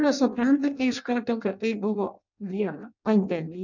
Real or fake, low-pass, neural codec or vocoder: fake; 7.2 kHz; codec, 16 kHz in and 24 kHz out, 0.6 kbps, FireRedTTS-2 codec